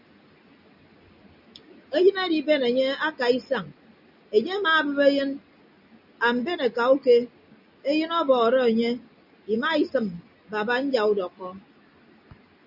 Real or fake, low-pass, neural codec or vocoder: real; 5.4 kHz; none